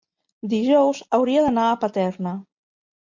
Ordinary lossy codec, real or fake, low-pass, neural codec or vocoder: MP3, 64 kbps; real; 7.2 kHz; none